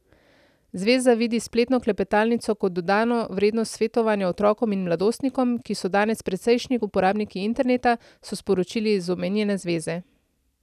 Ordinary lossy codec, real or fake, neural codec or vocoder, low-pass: none; real; none; 14.4 kHz